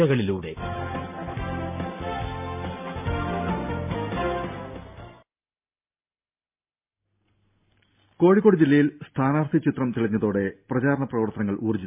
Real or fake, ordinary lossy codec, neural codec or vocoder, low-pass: real; MP3, 32 kbps; none; 3.6 kHz